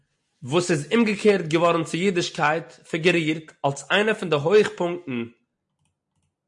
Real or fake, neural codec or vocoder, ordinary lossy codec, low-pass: real; none; MP3, 48 kbps; 10.8 kHz